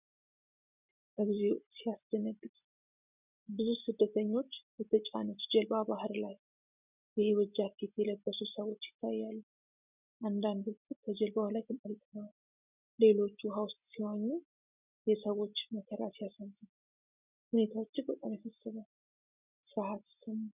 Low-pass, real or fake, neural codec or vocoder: 3.6 kHz; real; none